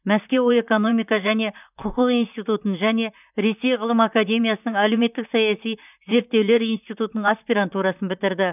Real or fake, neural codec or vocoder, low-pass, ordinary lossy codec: fake; vocoder, 44.1 kHz, 128 mel bands, Pupu-Vocoder; 3.6 kHz; none